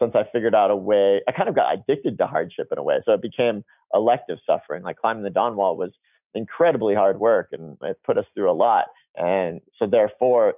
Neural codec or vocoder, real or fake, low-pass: none; real; 3.6 kHz